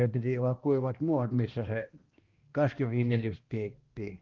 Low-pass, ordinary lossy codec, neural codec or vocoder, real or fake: 7.2 kHz; Opus, 16 kbps; codec, 16 kHz, 1 kbps, X-Codec, HuBERT features, trained on balanced general audio; fake